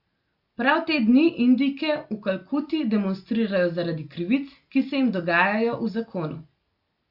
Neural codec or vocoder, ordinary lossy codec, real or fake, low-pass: none; Opus, 64 kbps; real; 5.4 kHz